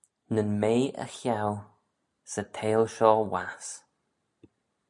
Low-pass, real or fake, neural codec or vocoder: 10.8 kHz; real; none